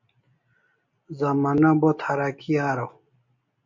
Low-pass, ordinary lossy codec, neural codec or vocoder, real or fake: 7.2 kHz; MP3, 48 kbps; none; real